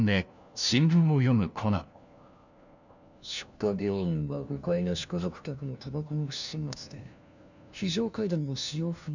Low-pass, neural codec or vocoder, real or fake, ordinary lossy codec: 7.2 kHz; codec, 16 kHz, 1 kbps, FunCodec, trained on LibriTTS, 50 frames a second; fake; none